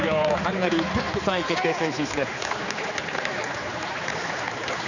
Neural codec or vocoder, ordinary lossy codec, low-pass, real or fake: codec, 16 kHz, 4 kbps, X-Codec, HuBERT features, trained on general audio; none; 7.2 kHz; fake